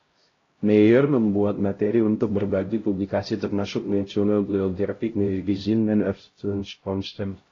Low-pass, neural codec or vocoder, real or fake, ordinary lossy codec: 7.2 kHz; codec, 16 kHz, 0.5 kbps, X-Codec, HuBERT features, trained on LibriSpeech; fake; AAC, 32 kbps